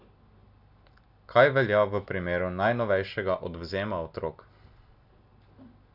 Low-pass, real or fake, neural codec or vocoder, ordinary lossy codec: 5.4 kHz; real; none; none